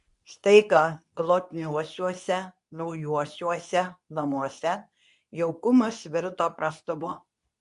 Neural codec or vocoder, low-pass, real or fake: codec, 24 kHz, 0.9 kbps, WavTokenizer, medium speech release version 2; 10.8 kHz; fake